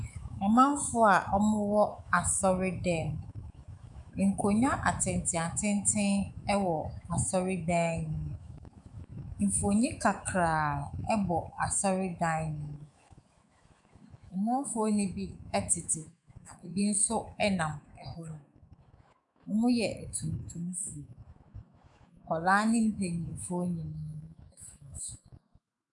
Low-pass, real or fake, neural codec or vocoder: 10.8 kHz; fake; autoencoder, 48 kHz, 128 numbers a frame, DAC-VAE, trained on Japanese speech